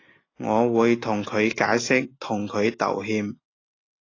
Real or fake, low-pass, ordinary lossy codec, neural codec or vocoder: real; 7.2 kHz; AAC, 32 kbps; none